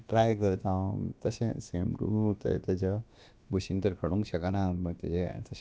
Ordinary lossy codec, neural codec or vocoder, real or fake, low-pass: none; codec, 16 kHz, about 1 kbps, DyCAST, with the encoder's durations; fake; none